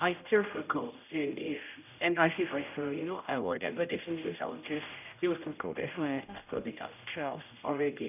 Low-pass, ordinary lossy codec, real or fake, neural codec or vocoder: 3.6 kHz; none; fake; codec, 16 kHz, 0.5 kbps, X-Codec, HuBERT features, trained on general audio